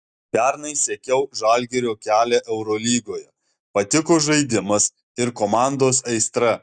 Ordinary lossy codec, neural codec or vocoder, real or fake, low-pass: Opus, 64 kbps; none; real; 9.9 kHz